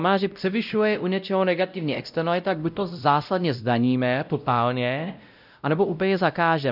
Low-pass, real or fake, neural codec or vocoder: 5.4 kHz; fake; codec, 16 kHz, 0.5 kbps, X-Codec, WavLM features, trained on Multilingual LibriSpeech